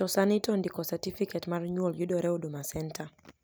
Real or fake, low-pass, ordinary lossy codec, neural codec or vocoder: real; none; none; none